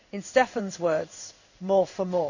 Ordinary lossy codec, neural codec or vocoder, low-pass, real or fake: none; codec, 16 kHz in and 24 kHz out, 1 kbps, XY-Tokenizer; 7.2 kHz; fake